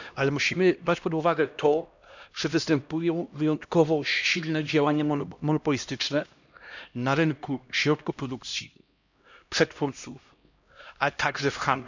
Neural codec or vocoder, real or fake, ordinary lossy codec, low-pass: codec, 16 kHz, 1 kbps, X-Codec, HuBERT features, trained on LibriSpeech; fake; none; 7.2 kHz